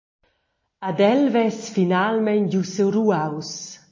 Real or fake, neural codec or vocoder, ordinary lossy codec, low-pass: real; none; MP3, 32 kbps; 7.2 kHz